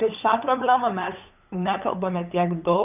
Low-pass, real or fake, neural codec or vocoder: 3.6 kHz; fake; codec, 16 kHz, 8 kbps, FunCodec, trained on LibriTTS, 25 frames a second